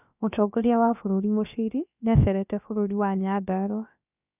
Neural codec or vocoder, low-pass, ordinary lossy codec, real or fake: codec, 16 kHz, about 1 kbps, DyCAST, with the encoder's durations; 3.6 kHz; none; fake